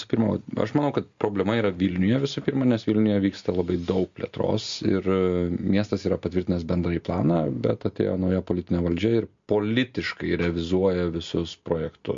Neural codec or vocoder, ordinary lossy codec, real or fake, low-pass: none; MP3, 48 kbps; real; 7.2 kHz